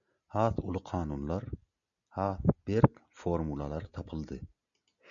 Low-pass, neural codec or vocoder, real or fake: 7.2 kHz; none; real